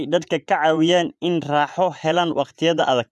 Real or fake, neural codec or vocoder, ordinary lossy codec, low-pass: fake; vocoder, 24 kHz, 100 mel bands, Vocos; none; none